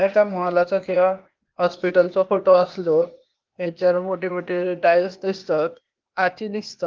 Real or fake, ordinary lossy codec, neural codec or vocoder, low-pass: fake; Opus, 32 kbps; codec, 16 kHz, 0.8 kbps, ZipCodec; 7.2 kHz